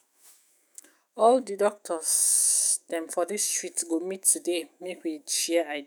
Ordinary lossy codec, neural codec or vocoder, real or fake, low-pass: none; autoencoder, 48 kHz, 128 numbers a frame, DAC-VAE, trained on Japanese speech; fake; none